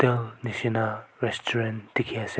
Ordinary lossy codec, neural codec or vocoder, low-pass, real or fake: none; none; none; real